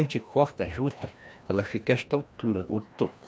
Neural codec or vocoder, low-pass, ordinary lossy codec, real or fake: codec, 16 kHz, 1 kbps, FreqCodec, larger model; none; none; fake